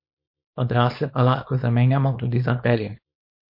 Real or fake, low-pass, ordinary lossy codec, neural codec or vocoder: fake; 5.4 kHz; MP3, 32 kbps; codec, 24 kHz, 0.9 kbps, WavTokenizer, small release